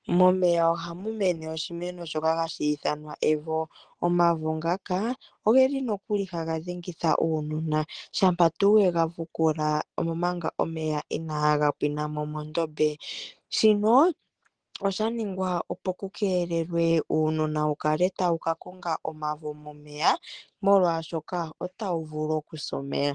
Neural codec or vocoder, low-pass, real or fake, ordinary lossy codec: none; 9.9 kHz; real; Opus, 16 kbps